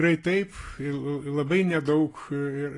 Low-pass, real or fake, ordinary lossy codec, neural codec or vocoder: 10.8 kHz; real; AAC, 32 kbps; none